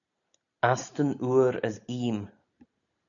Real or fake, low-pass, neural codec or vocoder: real; 7.2 kHz; none